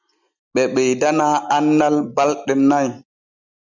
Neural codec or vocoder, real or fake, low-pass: none; real; 7.2 kHz